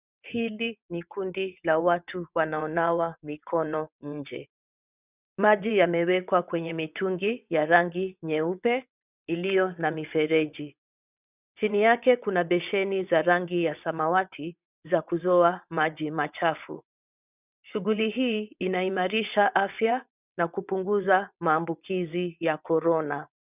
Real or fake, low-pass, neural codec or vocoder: fake; 3.6 kHz; vocoder, 22.05 kHz, 80 mel bands, WaveNeXt